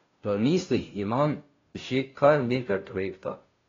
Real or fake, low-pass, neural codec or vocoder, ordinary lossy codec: fake; 7.2 kHz; codec, 16 kHz, 0.5 kbps, FunCodec, trained on Chinese and English, 25 frames a second; AAC, 32 kbps